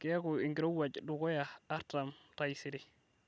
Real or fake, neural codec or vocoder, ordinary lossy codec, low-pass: real; none; none; none